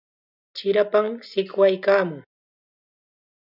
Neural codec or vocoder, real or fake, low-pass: none; real; 5.4 kHz